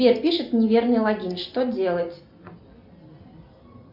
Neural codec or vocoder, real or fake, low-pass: none; real; 5.4 kHz